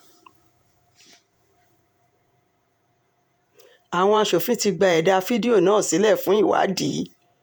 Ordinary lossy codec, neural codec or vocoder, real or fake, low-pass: none; vocoder, 48 kHz, 128 mel bands, Vocos; fake; none